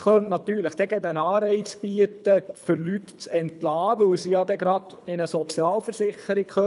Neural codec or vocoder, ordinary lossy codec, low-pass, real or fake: codec, 24 kHz, 3 kbps, HILCodec; none; 10.8 kHz; fake